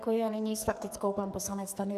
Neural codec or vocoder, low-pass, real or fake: codec, 44.1 kHz, 2.6 kbps, SNAC; 14.4 kHz; fake